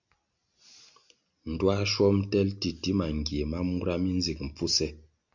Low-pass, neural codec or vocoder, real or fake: 7.2 kHz; none; real